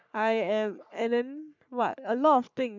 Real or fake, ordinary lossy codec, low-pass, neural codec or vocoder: fake; none; 7.2 kHz; codec, 44.1 kHz, 3.4 kbps, Pupu-Codec